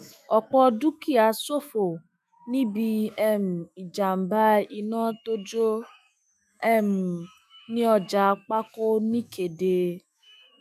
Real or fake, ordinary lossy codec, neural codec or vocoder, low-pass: fake; none; autoencoder, 48 kHz, 128 numbers a frame, DAC-VAE, trained on Japanese speech; 14.4 kHz